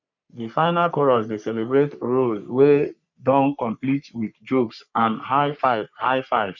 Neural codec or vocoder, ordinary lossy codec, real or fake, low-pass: codec, 44.1 kHz, 3.4 kbps, Pupu-Codec; none; fake; 7.2 kHz